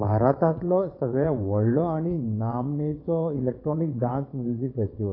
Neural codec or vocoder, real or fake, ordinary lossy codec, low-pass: vocoder, 22.05 kHz, 80 mel bands, WaveNeXt; fake; AAC, 32 kbps; 5.4 kHz